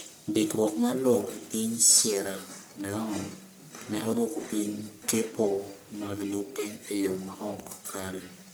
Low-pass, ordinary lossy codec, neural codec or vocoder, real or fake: none; none; codec, 44.1 kHz, 1.7 kbps, Pupu-Codec; fake